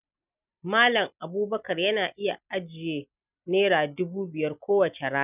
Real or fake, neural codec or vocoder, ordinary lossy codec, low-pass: real; none; none; 3.6 kHz